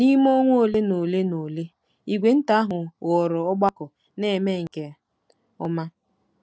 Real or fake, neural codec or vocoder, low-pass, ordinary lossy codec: real; none; none; none